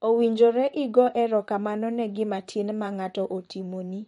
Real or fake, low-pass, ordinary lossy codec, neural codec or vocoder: fake; 19.8 kHz; MP3, 48 kbps; vocoder, 44.1 kHz, 128 mel bands, Pupu-Vocoder